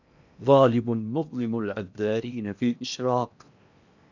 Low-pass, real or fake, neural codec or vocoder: 7.2 kHz; fake; codec, 16 kHz in and 24 kHz out, 0.8 kbps, FocalCodec, streaming, 65536 codes